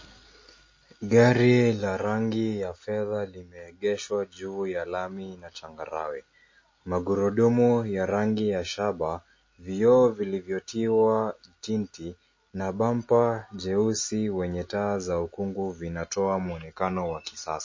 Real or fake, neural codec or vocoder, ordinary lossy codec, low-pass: real; none; MP3, 32 kbps; 7.2 kHz